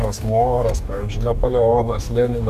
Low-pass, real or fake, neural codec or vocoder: 14.4 kHz; fake; codec, 44.1 kHz, 2.6 kbps, DAC